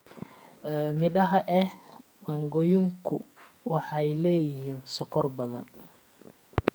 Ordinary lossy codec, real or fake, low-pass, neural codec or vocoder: none; fake; none; codec, 44.1 kHz, 2.6 kbps, SNAC